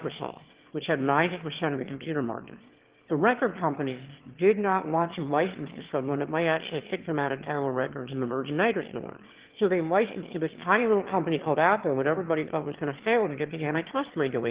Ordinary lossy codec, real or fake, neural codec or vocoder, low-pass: Opus, 16 kbps; fake; autoencoder, 22.05 kHz, a latent of 192 numbers a frame, VITS, trained on one speaker; 3.6 kHz